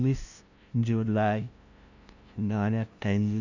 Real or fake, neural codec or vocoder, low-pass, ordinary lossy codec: fake; codec, 16 kHz, 0.5 kbps, FunCodec, trained on LibriTTS, 25 frames a second; 7.2 kHz; none